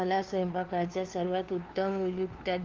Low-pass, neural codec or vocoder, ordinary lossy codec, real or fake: 7.2 kHz; codec, 16 kHz, 2 kbps, FunCodec, trained on LibriTTS, 25 frames a second; Opus, 32 kbps; fake